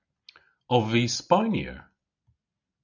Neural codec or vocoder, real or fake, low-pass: none; real; 7.2 kHz